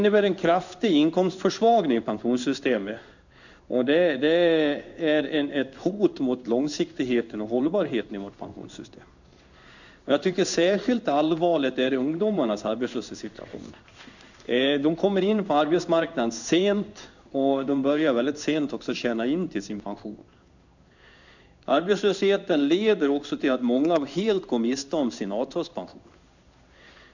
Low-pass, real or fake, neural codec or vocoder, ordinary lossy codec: 7.2 kHz; fake; codec, 16 kHz in and 24 kHz out, 1 kbps, XY-Tokenizer; none